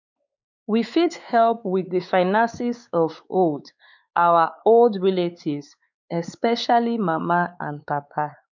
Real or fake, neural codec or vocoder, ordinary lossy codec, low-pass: fake; codec, 16 kHz, 4 kbps, X-Codec, WavLM features, trained on Multilingual LibriSpeech; none; 7.2 kHz